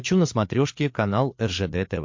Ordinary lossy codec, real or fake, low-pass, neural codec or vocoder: MP3, 48 kbps; fake; 7.2 kHz; codec, 16 kHz, 8 kbps, FreqCodec, larger model